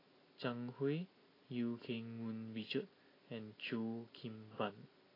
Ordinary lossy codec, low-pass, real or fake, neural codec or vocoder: AAC, 24 kbps; 5.4 kHz; real; none